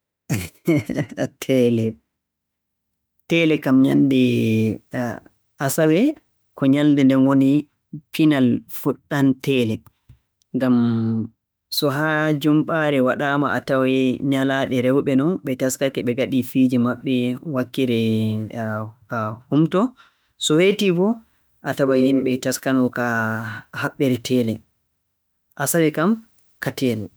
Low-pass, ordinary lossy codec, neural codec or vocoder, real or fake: none; none; autoencoder, 48 kHz, 32 numbers a frame, DAC-VAE, trained on Japanese speech; fake